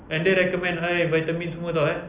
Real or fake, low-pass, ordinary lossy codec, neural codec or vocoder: real; 3.6 kHz; Opus, 64 kbps; none